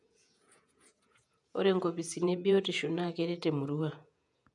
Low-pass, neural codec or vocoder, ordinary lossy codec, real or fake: 10.8 kHz; vocoder, 48 kHz, 128 mel bands, Vocos; none; fake